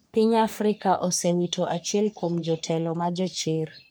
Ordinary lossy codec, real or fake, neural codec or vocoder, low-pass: none; fake; codec, 44.1 kHz, 3.4 kbps, Pupu-Codec; none